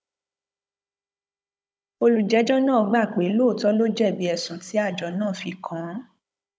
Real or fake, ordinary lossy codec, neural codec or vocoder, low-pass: fake; none; codec, 16 kHz, 16 kbps, FunCodec, trained on Chinese and English, 50 frames a second; none